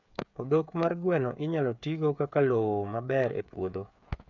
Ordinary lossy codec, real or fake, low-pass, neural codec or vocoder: none; fake; 7.2 kHz; codec, 16 kHz, 8 kbps, FreqCodec, smaller model